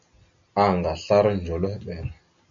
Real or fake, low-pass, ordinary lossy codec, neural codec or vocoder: real; 7.2 kHz; AAC, 64 kbps; none